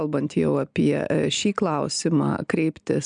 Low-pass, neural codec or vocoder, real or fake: 9.9 kHz; none; real